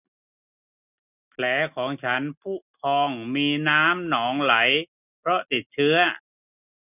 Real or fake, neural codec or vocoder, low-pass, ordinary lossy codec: real; none; 3.6 kHz; none